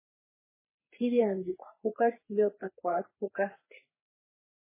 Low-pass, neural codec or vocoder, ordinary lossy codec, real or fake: 3.6 kHz; codec, 44.1 kHz, 3.4 kbps, Pupu-Codec; MP3, 16 kbps; fake